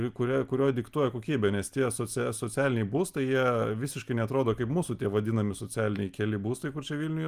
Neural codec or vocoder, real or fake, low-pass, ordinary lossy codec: none; real; 14.4 kHz; Opus, 32 kbps